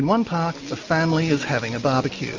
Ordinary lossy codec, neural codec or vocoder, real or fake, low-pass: Opus, 32 kbps; none; real; 7.2 kHz